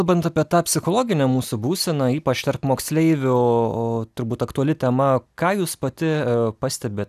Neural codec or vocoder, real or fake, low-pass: none; real; 14.4 kHz